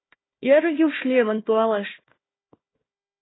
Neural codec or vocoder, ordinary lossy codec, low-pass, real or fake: codec, 16 kHz, 1 kbps, FunCodec, trained on Chinese and English, 50 frames a second; AAC, 16 kbps; 7.2 kHz; fake